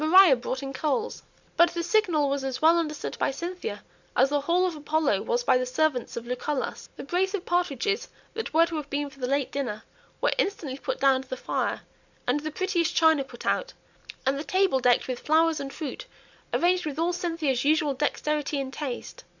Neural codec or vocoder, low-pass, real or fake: none; 7.2 kHz; real